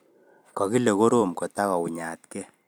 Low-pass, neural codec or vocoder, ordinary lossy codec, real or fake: none; vocoder, 44.1 kHz, 128 mel bands every 256 samples, BigVGAN v2; none; fake